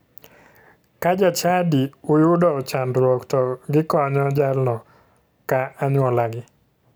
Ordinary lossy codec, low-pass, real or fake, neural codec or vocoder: none; none; real; none